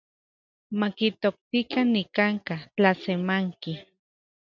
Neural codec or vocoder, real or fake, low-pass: none; real; 7.2 kHz